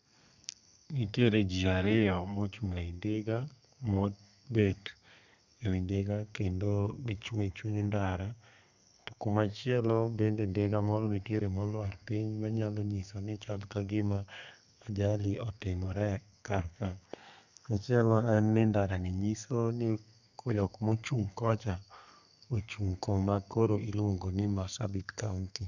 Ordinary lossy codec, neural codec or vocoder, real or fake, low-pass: none; codec, 32 kHz, 1.9 kbps, SNAC; fake; 7.2 kHz